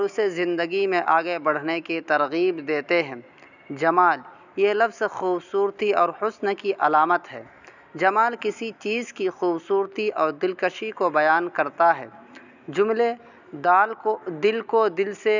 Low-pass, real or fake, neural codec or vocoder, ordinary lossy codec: 7.2 kHz; real; none; none